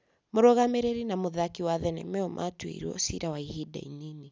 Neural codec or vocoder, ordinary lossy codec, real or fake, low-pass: none; none; real; none